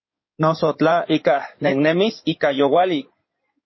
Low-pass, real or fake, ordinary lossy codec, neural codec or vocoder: 7.2 kHz; fake; MP3, 24 kbps; codec, 16 kHz in and 24 kHz out, 2.2 kbps, FireRedTTS-2 codec